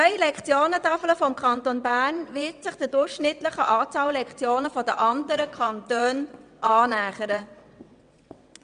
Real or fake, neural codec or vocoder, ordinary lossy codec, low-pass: fake; vocoder, 22.05 kHz, 80 mel bands, WaveNeXt; none; 9.9 kHz